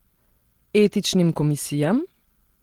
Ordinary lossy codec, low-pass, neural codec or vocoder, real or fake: Opus, 16 kbps; 19.8 kHz; none; real